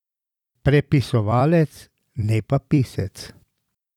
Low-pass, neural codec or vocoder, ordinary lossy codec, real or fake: 19.8 kHz; vocoder, 44.1 kHz, 128 mel bands every 256 samples, BigVGAN v2; none; fake